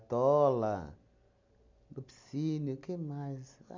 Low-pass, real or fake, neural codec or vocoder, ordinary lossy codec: 7.2 kHz; real; none; MP3, 64 kbps